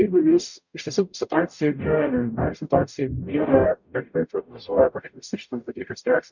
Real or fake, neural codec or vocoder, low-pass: fake; codec, 44.1 kHz, 0.9 kbps, DAC; 7.2 kHz